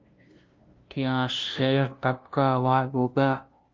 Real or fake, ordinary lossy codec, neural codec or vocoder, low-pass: fake; Opus, 32 kbps; codec, 16 kHz, 0.5 kbps, FunCodec, trained on LibriTTS, 25 frames a second; 7.2 kHz